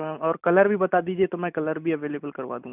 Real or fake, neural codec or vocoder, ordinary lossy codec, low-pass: real; none; none; 3.6 kHz